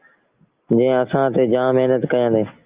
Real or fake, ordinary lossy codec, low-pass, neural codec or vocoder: real; Opus, 24 kbps; 3.6 kHz; none